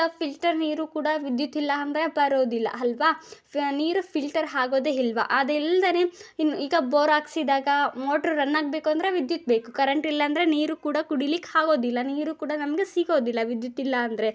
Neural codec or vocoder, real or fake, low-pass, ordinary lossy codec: none; real; none; none